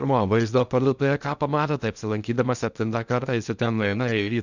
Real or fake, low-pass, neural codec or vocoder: fake; 7.2 kHz; codec, 16 kHz in and 24 kHz out, 0.6 kbps, FocalCodec, streaming, 2048 codes